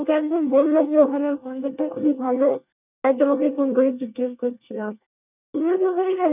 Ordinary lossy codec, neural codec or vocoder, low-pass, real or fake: none; codec, 24 kHz, 1 kbps, SNAC; 3.6 kHz; fake